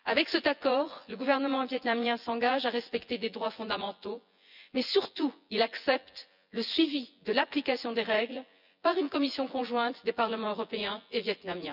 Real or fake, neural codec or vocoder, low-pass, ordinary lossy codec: fake; vocoder, 24 kHz, 100 mel bands, Vocos; 5.4 kHz; none